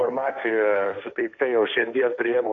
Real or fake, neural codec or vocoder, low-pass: fake; codec, 16 kHz, 1.1 kbps, Voila-Tokenizer; 7.2 kHz